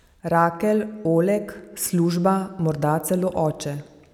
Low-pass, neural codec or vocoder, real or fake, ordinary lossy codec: 19.8 kHz; none; real; none